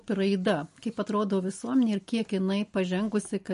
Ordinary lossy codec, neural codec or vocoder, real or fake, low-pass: MP3, 48 kbps; none; real; 10.8 kHz